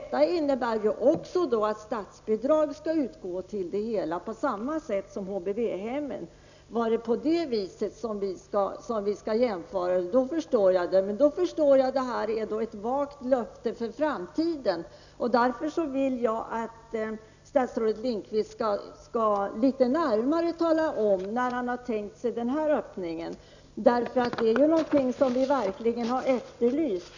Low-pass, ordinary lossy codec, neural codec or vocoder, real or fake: 7.2 kHz; none; none; real